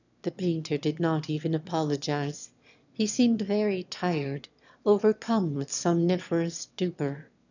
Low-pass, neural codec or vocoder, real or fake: 7.2 kHz; autoencoder, 22.05 kHz, a latent of 192 numbers a frame, VITS, trained on one speaker; fake